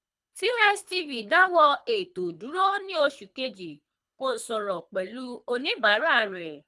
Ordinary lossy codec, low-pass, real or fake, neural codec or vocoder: none; none; fake; codec, 24 kHz, 3 kbps, HILCodec